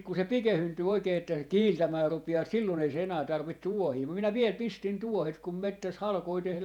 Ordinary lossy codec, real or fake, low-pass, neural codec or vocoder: none; fake; 19.8 kHz; vocoder, 48 kHz, 128 mel bands, Vocos